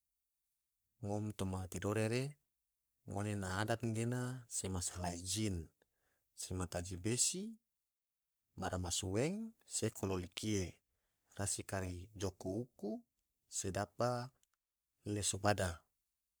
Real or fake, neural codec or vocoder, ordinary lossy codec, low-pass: fake; codec, 44.1 kHz, 3.4 kbps, Pupu-Codec; none; none